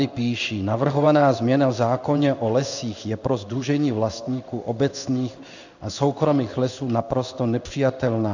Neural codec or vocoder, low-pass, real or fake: codec, 16 kHz in and 24 kHz out, 1 kbps, XY-Tokenizer; 7.2 kHz; fake